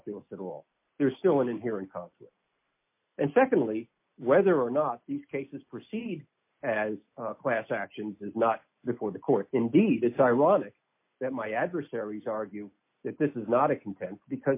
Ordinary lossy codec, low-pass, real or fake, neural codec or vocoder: MP3, 24 kbps; 3.6 kHz; real; none